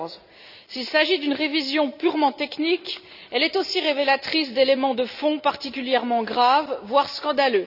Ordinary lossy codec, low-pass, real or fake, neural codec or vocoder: none; 5.4 kHz; real; none